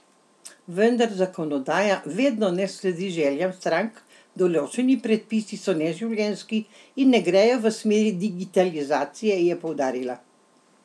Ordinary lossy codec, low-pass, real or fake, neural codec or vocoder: none; none; real; none